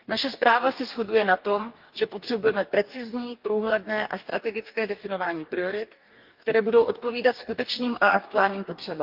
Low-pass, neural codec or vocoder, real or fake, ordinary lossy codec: 5.4 kHz; codec, 44.1 kHz, 2.6 kbps, DAC; fake; Opus, 32 kbps